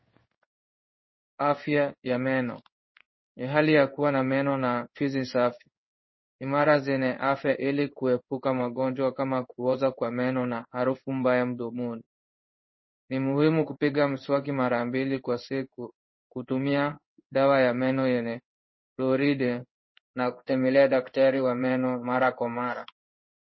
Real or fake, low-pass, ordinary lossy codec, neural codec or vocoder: fake; 7.2 kHz; MP3, 24 kbps; codec, 16 kHz in and 24 kHz out, 1 kbps, XY-Tokenizer